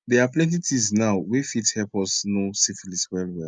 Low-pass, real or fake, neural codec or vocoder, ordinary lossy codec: 9.9 kHz; real; none; none